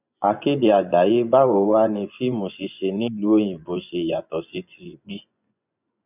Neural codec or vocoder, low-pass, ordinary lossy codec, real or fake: vocoder, 24 kHz, 100 mel bands, Vocos; 3.6 kHz; none; fake